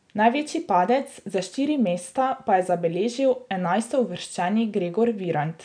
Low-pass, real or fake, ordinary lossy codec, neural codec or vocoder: 9.9 kHz; real; none; none